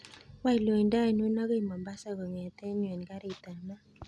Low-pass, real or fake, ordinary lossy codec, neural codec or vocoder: none; real; none; none